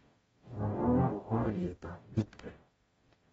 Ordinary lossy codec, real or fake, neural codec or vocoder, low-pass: AAC, 24 kbps; fake; codec, 44.1 kHz, 0.9 kbps, DAC; 19.8 kHz